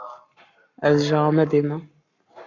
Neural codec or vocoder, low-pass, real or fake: codec, 44.1 kHz, 7.8 kbps, Pupu-Codec; 7.2 kHz; fake